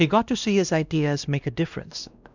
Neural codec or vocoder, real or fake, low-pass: codec, 16 kHz, 1 kbps, X-Codec, WavLM features, trained on Multilingual LibriSpeech; fake; 7.2 kHz